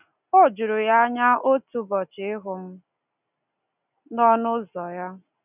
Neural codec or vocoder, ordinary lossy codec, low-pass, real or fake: none; none; 3.6 kHz; real